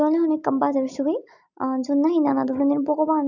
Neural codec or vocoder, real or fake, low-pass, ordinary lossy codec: none; real; 7.2 kHz; none